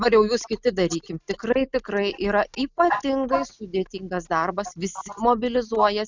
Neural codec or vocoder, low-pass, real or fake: none; 7.2 kHz; real